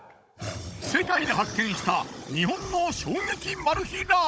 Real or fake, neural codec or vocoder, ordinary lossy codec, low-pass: fake; codec, 16 kHz, 16 kbps, FunCodec, trained on Chinese and English, 50 frames a second; none; none